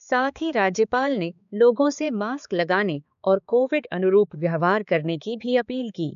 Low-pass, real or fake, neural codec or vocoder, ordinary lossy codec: 7.2 kHz; fake; codec, 16 kHz, 4 kbps, X-Codec, HuBERT features, trained on balanced general audio; none